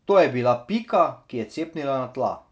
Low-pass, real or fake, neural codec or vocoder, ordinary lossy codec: none; real; none; none